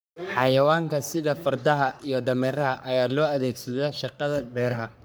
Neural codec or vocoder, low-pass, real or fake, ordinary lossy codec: codec, 44.1 kHz, 3.4 kbps, Pupu-Codec; none; fake; none